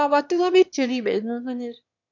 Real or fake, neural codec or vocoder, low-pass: fake; autoencoder, 22.05 kHz, a latent of 192 numbers a frame, VITS, trained on one speaker; 7.2 kHz